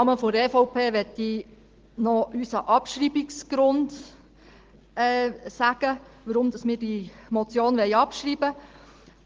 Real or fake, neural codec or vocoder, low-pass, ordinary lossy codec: real; none; 7.2 kHz; Opus, 16 kbps